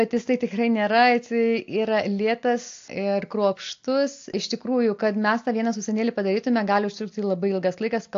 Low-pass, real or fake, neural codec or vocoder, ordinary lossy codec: 7.2 kHz; real; none; AAC, 48 kbps